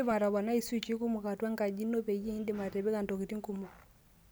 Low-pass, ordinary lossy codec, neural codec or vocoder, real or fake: none; none; none; real